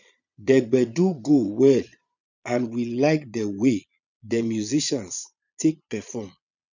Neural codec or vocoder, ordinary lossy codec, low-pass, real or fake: vocoder, 22.05 kHz, 80 mel bands, Vocos; none; 7.2 kHz; fake